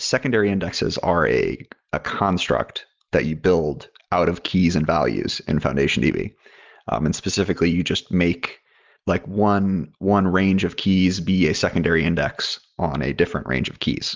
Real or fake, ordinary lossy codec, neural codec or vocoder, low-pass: real; Opus, 32 kbps; none; 7.2 kHz